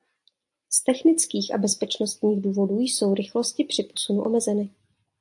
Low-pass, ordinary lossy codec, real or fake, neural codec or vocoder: 10.8 kHz; AAC, 64 kbps; real; none